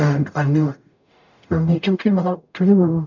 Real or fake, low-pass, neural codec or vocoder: fake; 7.2 kHz; codec, 44.1 kHz, 0.9 kbps, DAC